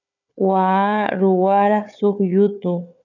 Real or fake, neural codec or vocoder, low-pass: fake; codec, 16 kHz, 4 kbps, FunCodec, trained on Chinese and English, 50 frames a second; 7.2 kHz